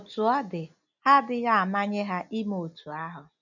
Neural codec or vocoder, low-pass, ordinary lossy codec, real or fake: none; 7.2 kHz; none; real